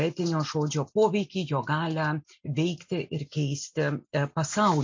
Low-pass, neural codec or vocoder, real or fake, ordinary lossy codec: 7.2 kHz; none; real; MP3, 48 kbps